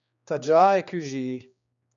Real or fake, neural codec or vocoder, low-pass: fake; codec, 16 kHz, 2 kbps, X-Codec, HuBERT features, trained on general audio; 7.2 kHz